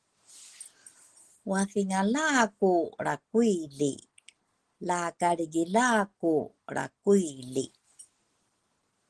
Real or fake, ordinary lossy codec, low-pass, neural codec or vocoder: real; Opus, 16 kbps; 10.8 kHz; none